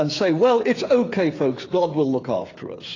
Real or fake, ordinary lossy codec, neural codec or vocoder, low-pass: fake; AAC, 32 kbps; codec, 16 kHz, 8 kbps, FreqCodec, smaller model; 7.2 kHz